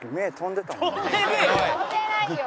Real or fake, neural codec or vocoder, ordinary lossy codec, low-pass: real; none; none; none